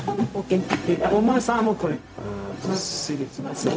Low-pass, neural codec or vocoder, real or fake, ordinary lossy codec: none; codec, 16 kHz, 0.4 kbps, LongCat-Audio-Codec; fake; none